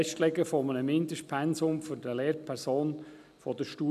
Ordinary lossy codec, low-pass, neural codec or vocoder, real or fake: none; 14.4 kHz; none; real